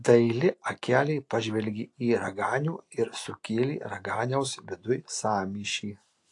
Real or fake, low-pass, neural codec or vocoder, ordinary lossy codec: real; 10.8 kHz; none; AAC, 48 kbps